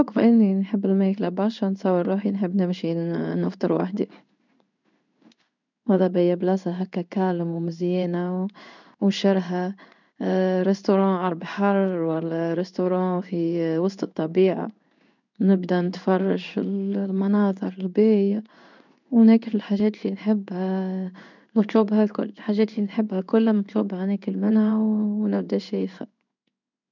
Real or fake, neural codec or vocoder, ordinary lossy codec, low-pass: fake; codec, 16 kHz in and 24 kHz out, 1 kbps, XY-Tokenizer; none; 7.2 kHz